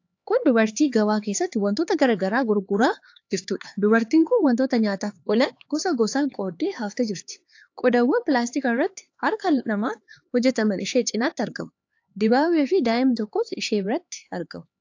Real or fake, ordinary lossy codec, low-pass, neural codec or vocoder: fake; AAC, 48 kbps; 7.2 kHz; codec, 16 kHz, 4 kbps, X-Codec, HuBERT features, trained on LibriSpeech